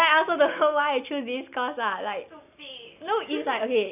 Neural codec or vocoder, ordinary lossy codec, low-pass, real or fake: none; none; 3.6 kHz; real